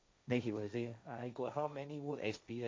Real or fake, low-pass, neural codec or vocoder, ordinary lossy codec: fake; none; codec, 16 kHz, 1.1 kbps, Voila-Tokenizer; none